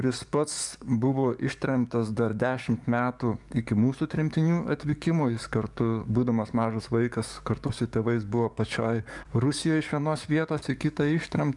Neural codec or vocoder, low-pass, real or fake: codec, 44.1 kHz, 7.8 kbps, DAC; 10.8 kHz; fake